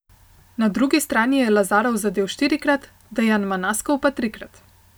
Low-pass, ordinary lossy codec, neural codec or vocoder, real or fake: none; none; none; real